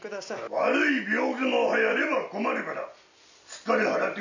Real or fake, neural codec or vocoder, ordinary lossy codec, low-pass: real; none; none; 7.2 kHz